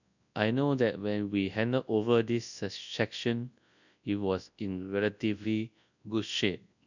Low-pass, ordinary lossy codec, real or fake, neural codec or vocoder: 7.2 kHz; none; fake; codec, 24 kHz, 0.9 kbps, WavTokenizer, large speech release